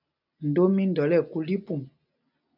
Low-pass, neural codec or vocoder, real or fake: 5.4 kHz; none; real